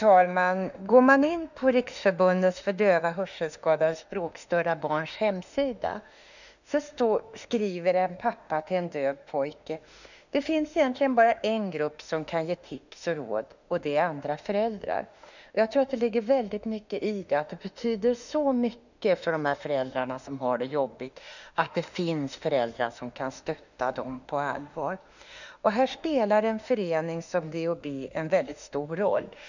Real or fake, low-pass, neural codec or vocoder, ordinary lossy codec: fake; 7.2 kHz; autoencoder, 48 kHz, 32 numbers a frame, DAC-VAE, trained on Japanese speech; none